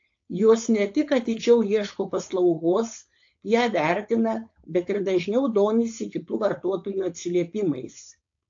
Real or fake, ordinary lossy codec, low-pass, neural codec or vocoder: fake; AAC, 48 kbps; 7.2 kHz; codec, 16 kHz, 4.8 kbps, FACodec